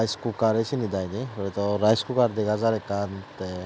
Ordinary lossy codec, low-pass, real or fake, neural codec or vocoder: none; none; real; none